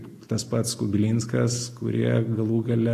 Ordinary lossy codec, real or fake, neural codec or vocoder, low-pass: AAC, 48 kbps; real; none; 14.4 kHz